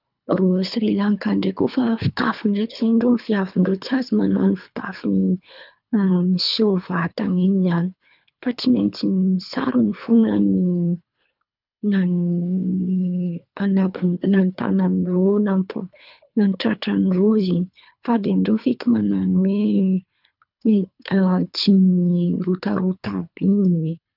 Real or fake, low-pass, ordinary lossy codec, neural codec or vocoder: fake; 5.4 kHz; none; codec, 24 kHz, 3 kbps, HILCodec